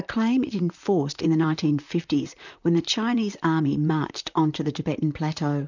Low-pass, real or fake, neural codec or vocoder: 7.2 kHz; fake; vocoder, 44.1 kHz, 128 mel bands, Pupu-Vocoder